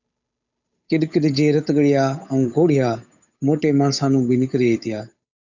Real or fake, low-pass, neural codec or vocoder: fake; 7.2 kHz; codec, 16 kHz, 8 kbps, FunCodec, trained on Chinese and English, 25 frames a second